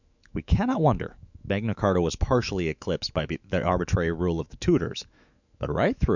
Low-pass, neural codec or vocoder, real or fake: 7.2 kHz; autoencoder, 48 kHz, 128 numbers a frame, DAC-VAE, trained on Japanese speech; fake